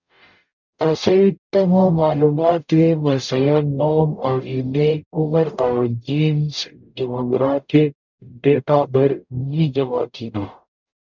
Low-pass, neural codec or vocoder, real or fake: 7.2 kHz; codec, 44.1 kHz, 0.9 kbps, DAC; fake